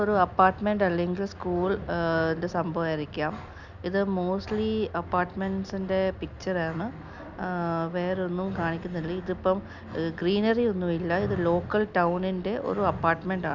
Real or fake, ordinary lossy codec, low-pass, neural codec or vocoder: real; none; 7.2 kHz; none